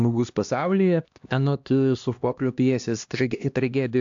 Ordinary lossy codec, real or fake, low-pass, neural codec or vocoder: AAC, 64 kbps; fake; 7.2 kHz; codec, 16 kHz, 1 kbps, X-Codec, HuBERT features, trained on LibriSpeech